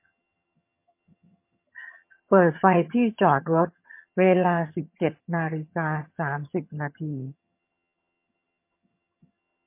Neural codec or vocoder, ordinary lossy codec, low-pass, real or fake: vocoder, 22.05 kHz, 80 mel bands, HiFi-GAN; MP3, 24 kbps; 3.6 kHz; fake